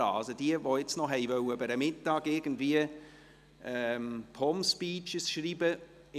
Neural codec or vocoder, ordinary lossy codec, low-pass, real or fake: none; none; 14.4 kHz; real